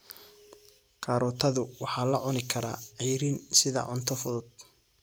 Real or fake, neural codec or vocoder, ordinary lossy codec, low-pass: real; none; none; none